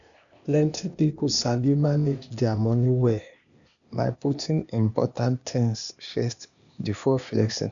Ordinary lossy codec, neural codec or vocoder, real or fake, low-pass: none; codec, 16 kHz, 0.8 kbps, ZipCodec; fake; 7.2 kHz